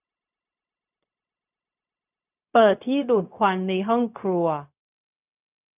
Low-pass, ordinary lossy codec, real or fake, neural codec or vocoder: 3.6 kHz; none; fake; codec, 16 kHz, 0.4 kbps, LongCat-Audio-Codec